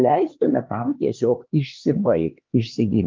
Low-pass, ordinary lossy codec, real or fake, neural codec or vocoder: 7.2 kHz; Opus, 32 kbps; fake; codec, 16 kHz, 2 kbps, X-Codec, HuBERT features, trained on LibriSpeech